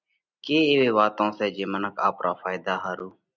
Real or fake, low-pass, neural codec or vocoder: real; 7.2 kHz; none